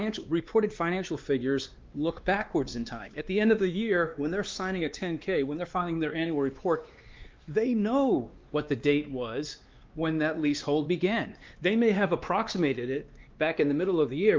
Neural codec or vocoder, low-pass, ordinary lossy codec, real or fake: codec, 16 kHz, 2 kbps, X-Codec, WavLM features, trained on Multilingual LibriSpeech; 7.2 kHz; Opus, 32 kbps; fake